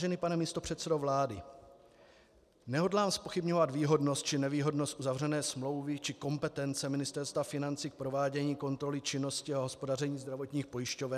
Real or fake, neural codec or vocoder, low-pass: real; none; 14.4 kHz